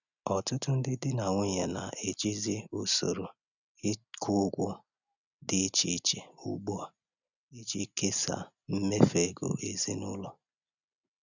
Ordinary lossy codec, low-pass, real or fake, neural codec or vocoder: none; 7.2 kHz; real; none